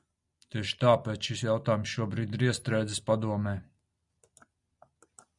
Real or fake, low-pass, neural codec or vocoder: real; 10.8 kHz; none